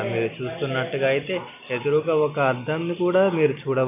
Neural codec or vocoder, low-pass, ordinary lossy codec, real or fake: none; 3.6 kHz; none; real